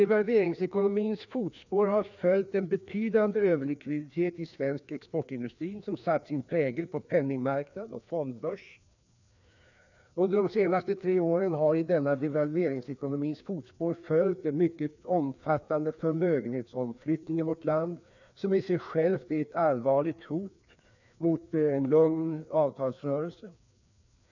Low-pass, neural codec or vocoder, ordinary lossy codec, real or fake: 7.2 kHz; codec, 16 kHz, 2 kbps, FreqCodec, larger model; none; fake